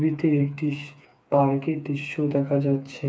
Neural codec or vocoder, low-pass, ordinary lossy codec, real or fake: codec, 16 kHz, 4 kbps, FreqCodec, smaller model; none; none; fake